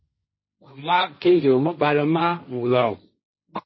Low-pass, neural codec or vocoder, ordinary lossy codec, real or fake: 7.2 kHz; codec, 16 kHz, 1.1 kbps, Voila-Tokenizer; MP3, 24 kbps; fake